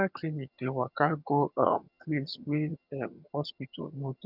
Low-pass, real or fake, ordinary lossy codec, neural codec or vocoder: 5.4 kHz; fake; none; vocoder, 22.05 kHz, 80 mel bands, HiFi-GAN